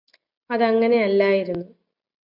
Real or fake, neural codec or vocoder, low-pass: real; none; 5.4 kHz